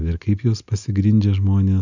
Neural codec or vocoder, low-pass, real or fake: none; 7.2 kHz; real